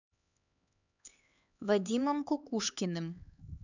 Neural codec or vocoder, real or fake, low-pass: codec, 16 kHz, 4 kbps, X-Codec, HuBERT features, trained on LibriSpeech; fake; 7.2 kHz